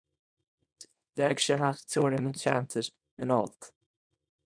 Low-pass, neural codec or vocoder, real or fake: 9.9 kHz; codec, 24 kHz, 0.9 kbps, WavTokenizer, small release; fake